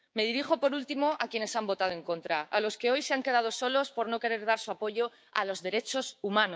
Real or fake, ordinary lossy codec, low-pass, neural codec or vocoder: fake; none; none; codec, 16 kHz, 6 kbps, DAC